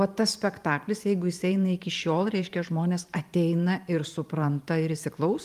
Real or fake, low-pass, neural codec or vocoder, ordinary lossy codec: fake; 14.4 kHz; vocoder, 44.1 kHz, 128 mel bands every 512 samples, BigVGAN v2; Opus, 32 kbps